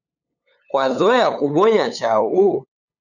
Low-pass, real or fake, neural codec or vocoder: 7.2 kHz; fake; codec, 16 kHz, 8 kbps, FunCodec, trained on LibriTTS, 25 frames a second